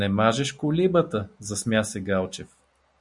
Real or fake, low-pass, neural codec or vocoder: real; 10.8 kHz; none